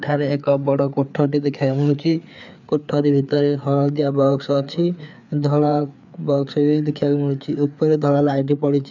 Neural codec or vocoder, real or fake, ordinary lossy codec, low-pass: codec, 16 kHz, 4 kbps, FreqCodec, larger model; fake; none; 7.2 kHz